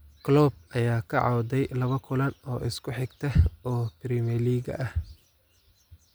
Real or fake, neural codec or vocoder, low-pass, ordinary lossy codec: real; none; none; none